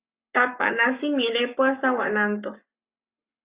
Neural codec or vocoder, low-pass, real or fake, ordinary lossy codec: codec, 44.1 kHz, 7.8 kbps, Pupu-Codec; 3.6 kHz; fake; Opus, 64 kbps